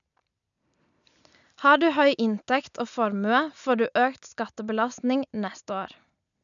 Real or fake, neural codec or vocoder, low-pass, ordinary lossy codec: real; none; 7.2 kHz; none